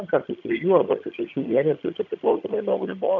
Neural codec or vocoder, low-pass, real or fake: vocoder, 22.05 kHz, 80 mel bands, HiFi-GAN; 7.2 kHz; fake